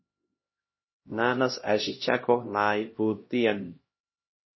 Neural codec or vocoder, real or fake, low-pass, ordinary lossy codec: codec, 16 kHz, 1 kbps, X-Codec, HuBERT features, trained on LibriSpeech; fake; 7.2 kHz; MP3, 24 kbps